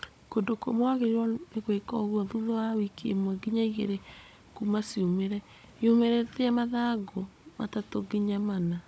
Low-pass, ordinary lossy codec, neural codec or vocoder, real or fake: none; none; codec, 16 kHz, 16 kbps, FunCodec, trained on Chinese and English, 50 frames a second; fake